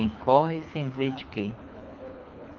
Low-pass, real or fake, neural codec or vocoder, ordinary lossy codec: 7.2 kHz; fake; codec, 24 kHz, 3 kbps, HILCodec; Opus, 24 kbps